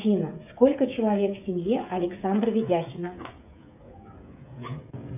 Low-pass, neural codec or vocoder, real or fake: 3.6 kHz; codec, 16 kHz, 16 kbps, FreqCodec, smaller model; fake